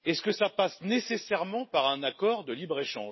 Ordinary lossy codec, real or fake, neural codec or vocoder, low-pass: MP3, 24 kbps; real; none; 7.2 kHz